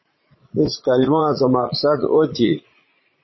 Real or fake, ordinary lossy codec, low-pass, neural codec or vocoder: fake; MP3, 24 kbps; 7.2 kHz; vocoder, 22.05 kHz, 80 mel bands, Vocos